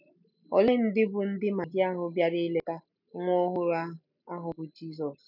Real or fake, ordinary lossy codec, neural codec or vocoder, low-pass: real; none; none; 5.4 kHz